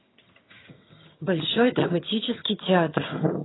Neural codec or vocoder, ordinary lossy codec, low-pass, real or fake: vocoder, 22.05 kHz, 80 mel bands, HiFi-GAN; AAC, 16 kbps; 7.2 kHz; fake